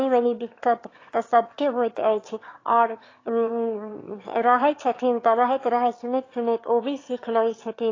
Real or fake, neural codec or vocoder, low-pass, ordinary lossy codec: fake; autoencoder, 22.05 kHz, a latent of 192 numbers a frame, VITS, trained on one speaker; 7.2 kHz; AAC, 32 kbps